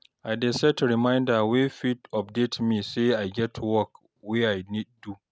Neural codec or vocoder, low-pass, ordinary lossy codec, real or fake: none; none; none; real